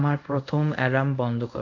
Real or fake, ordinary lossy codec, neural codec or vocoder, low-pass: fake; AAC, 48 kbps; codec, 24 kHz, 0.5 kbps, DualCodec; 7.2 kHz